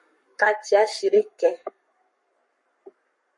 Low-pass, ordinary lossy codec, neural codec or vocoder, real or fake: 10.8 kHz; MP3, 48 kbps; codec, 44.1 kHz, 7.8 kbps, Pupu-Codec; fake